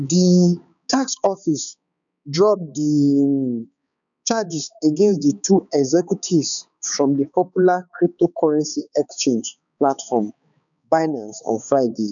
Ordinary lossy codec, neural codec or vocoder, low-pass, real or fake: none; codec, 16 kHz, 4 kbps, X-Codec, HuBERT features, trained on balanced general audio; 7.2 kHz; fake